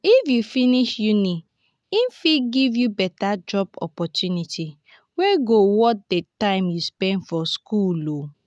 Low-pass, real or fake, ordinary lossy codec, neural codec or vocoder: none; real; none; none